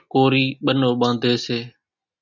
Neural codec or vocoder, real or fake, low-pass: none; real; 7.2 kHz